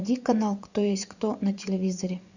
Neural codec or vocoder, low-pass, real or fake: vocoder, 24 kHz, 100 mel bands, Vocos; 7.2 kHz; fake